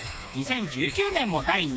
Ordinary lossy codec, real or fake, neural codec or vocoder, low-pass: none; fake; codec, 16 kHz, 2 kbps, FreqCodec, smaller model; none